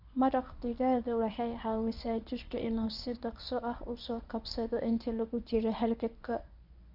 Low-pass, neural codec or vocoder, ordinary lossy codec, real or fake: 5.4 kHz; codec, 24 kHz, 0.9 kbps, WavTokenizer, medium speech release version 1; none; fake